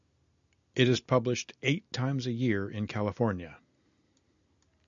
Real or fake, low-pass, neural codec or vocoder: real; 7.2 kHz; none